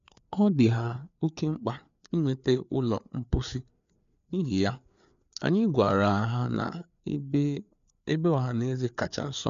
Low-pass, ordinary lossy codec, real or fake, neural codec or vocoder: 7.2 kHz; none; fake; codec, 16 kHz, 4 kbps, FreqCodec, larger model